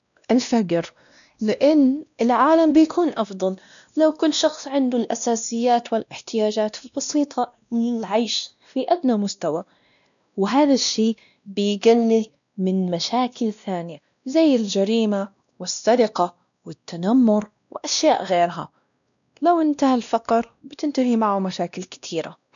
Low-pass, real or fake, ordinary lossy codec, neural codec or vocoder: 7.2 kHz; fake; none; codec, 16 kHz, 1 kbps, X-Codec, WavLM features, trained on Multilingual LibriSpeech